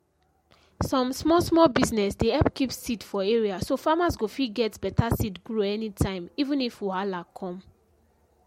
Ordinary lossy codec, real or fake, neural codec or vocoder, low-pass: MP3, 64 kbps; real; none; 19.8 kHz